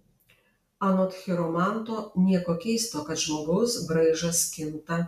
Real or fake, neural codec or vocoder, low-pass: real; none; 14.4 kHz